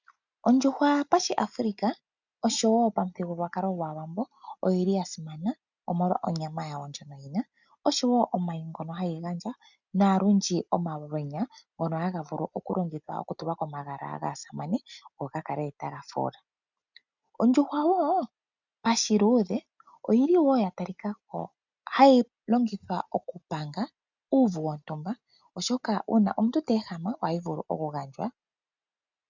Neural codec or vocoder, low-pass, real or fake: none; 7.2 kHz; real